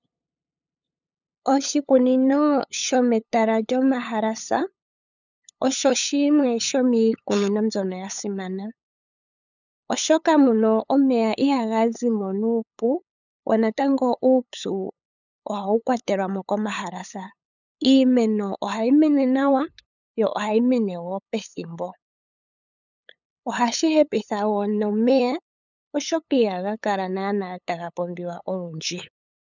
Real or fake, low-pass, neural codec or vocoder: fake; 7.2 kHz; codec, 16 kHz, 8 kbps, FunCodec, trained on LibriTTS, 25 frames a second